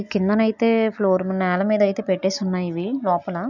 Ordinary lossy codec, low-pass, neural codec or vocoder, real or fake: none; 7.2 kHz; none; real